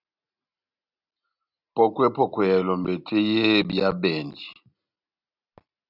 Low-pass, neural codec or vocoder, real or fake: 5.4 kHz; none; real